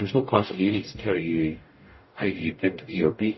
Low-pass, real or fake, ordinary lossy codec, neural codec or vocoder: 7.2 kHz; fake; MP3, 24 kbps; codec, 44.1 kHz, 0.9 kbps, DAC